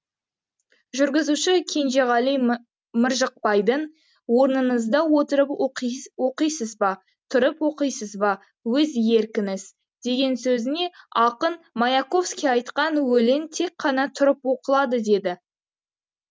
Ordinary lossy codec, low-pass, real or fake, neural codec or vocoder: none; none; real; none